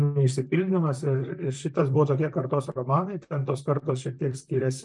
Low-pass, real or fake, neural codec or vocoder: 10.8 kHz; fake; vocoder, 44.1 kHz, 128 mel bands, Pupu-Vocoder